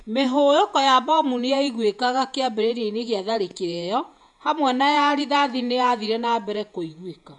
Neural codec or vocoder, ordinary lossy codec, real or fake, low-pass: vocoder, 48 kHz, 128 mel bands, Vocos; none; fake; 10.8 kHz